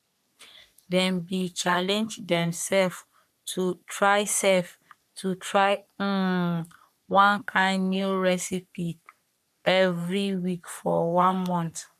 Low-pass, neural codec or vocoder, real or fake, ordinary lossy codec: 14.4 kHz; codec, 44.1 kHz, 3.4 kbps, Pupu-Codec; fake; none